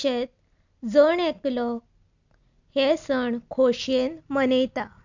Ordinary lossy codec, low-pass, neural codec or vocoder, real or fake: none; 7.2 kHz; none; real